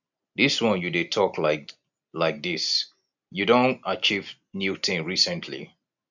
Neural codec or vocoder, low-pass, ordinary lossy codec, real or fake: none; 7.2 kHz; none; real